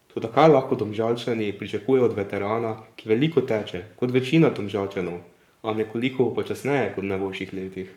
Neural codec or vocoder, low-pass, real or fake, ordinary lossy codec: codec, 44.1 kHz, 7.8 kbps, Pupu-Codec; 19.8 kHz; fake; none